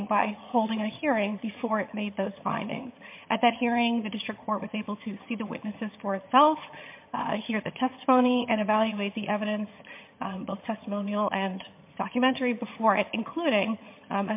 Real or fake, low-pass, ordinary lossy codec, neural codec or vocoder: fake; 3.6 kHz; MP3, 24 kbps; vocoder, 22.05 kHz, 80 mel bands, HiFi-GAN